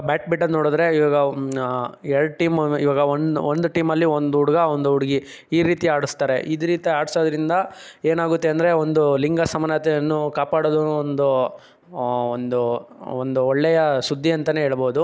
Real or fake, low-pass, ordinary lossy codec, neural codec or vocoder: real; none; none; none